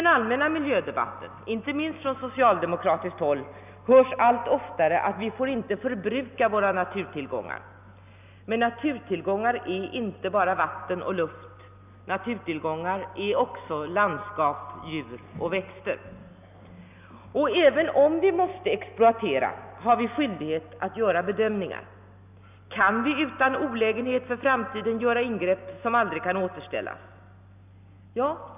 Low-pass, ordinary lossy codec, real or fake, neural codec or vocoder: 3.6 kHz; none; real; none